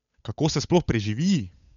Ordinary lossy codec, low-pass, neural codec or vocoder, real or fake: none; 7.2 kHz; codec, 16 kHz, 8 kbps, FunCodec, trained on Chinese and English, 25 frames a second; fake